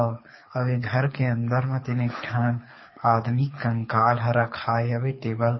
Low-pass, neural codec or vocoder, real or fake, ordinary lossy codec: 7.2 kHz; codec, 24 kHz, 6 kbps, HILCodec; fake; MP3, 24 kbps